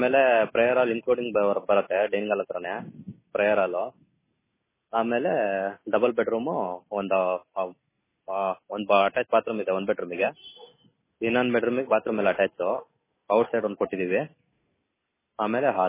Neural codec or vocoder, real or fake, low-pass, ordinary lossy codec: none; real; 3.6 kHz; MP3, 16 kbps